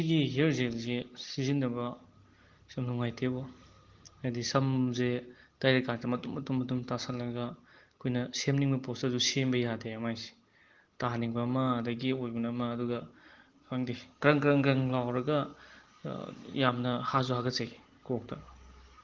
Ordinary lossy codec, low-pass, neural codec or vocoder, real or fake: Opus, 16 kbps; 7.2 kHz; none; real